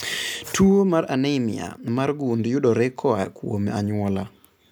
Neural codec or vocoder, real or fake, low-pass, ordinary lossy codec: none; real; none; none